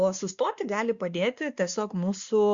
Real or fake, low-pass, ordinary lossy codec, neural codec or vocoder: fake; 7.2 kHz; Opus, 64 kbps; codec, 16 kHz, 2 kbps, X-Codec, WavLM features, trained on Multilingual LibriSpeech